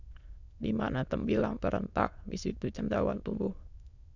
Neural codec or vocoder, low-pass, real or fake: autoencoder, 22.05 kHz, a latent of 192 numbers a frame, VITS, trained on many speakers; 7.2 kHz; fake